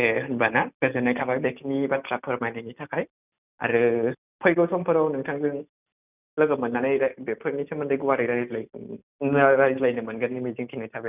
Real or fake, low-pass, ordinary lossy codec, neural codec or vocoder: real; 3.6 kHz; none; none